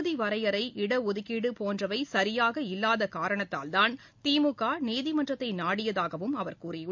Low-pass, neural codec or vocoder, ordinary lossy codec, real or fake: 7.2 kHz; none; MP3, 64 kbps; real